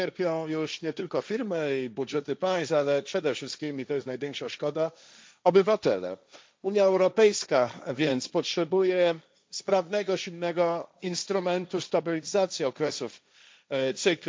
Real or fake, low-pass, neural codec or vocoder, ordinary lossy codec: fake; none; codec, 16 kHz, 1.1 kbps, Voila-Tokenizer; none